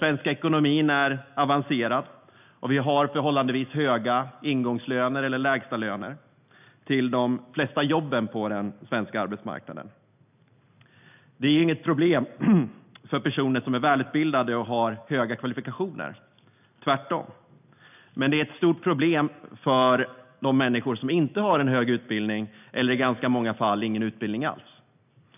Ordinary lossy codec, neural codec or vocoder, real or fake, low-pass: none; none; real; 3.6 kHz